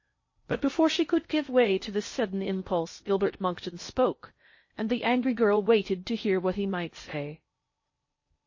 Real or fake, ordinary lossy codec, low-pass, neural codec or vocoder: fake; MP3, 32 kbps; 7.2 kHz; codec, 16 kHz in and 24 kHz out, 0.6 kbps, FocalCodec, streaming, 4096 codes